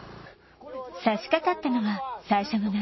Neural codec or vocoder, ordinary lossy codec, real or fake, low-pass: none; MP3, 24 kbps; real; 7.2 kHz